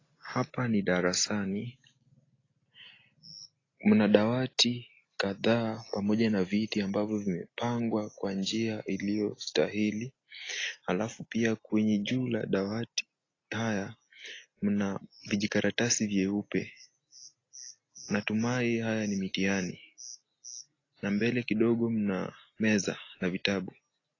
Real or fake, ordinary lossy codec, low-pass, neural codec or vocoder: real; AAC, 32 kbps; 7.2 kHz; none